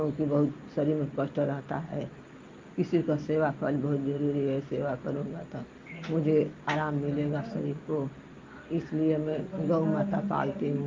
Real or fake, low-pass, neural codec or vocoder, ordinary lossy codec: real; 7.2 kHz; none; Opus, 24 kbps